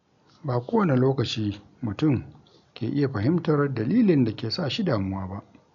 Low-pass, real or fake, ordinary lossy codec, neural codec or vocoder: 7.2 kHz; real; none; none